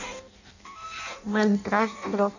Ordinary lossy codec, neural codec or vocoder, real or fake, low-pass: none; codec, 16 kHz in and 24 kHz out, 0.6 kbps, FireRedTTS-2 codec; fake; 7.2 kHz